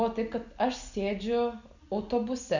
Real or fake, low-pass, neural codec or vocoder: real; 7.2 kHz; none